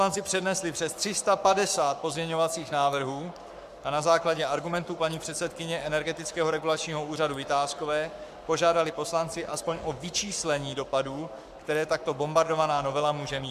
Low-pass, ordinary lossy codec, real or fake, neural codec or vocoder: 14.4 kHz; MP3, 96 kbps; fake; codec, 44.1 kHz, 7.8 kbps, Pupu-Codec